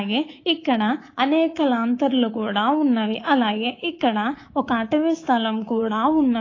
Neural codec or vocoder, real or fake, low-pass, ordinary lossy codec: codec, 24 kHz, 3.1 kbps, DualCodec; fake; 7.2 kHz; AAC, 32 kbps